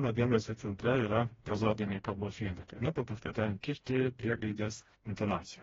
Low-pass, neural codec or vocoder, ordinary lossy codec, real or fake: 7.2 kHz; codec, 16 kHz, 1 kbps, FreqCodec, smaller model; AAC, 24 kbps; fake